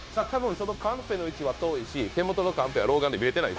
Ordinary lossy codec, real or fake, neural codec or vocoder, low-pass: none; fake; codec, 16 kHz, 0.9 kbps, LongCat-Audio-Codec; none